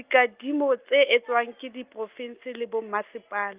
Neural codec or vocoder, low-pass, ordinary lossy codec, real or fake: none; 3.6 kHz; Opus, 32 kbps; real